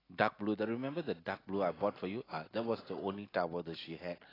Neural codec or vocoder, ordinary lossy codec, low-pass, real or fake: none; AAC, 24 kbps; 5.4 kHz; real